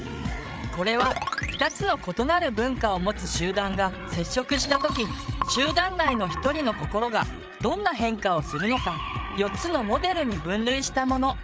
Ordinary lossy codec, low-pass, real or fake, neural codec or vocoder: none; none; fake; codec, 16 kHz, 8 kbps, FreqCodec, larger model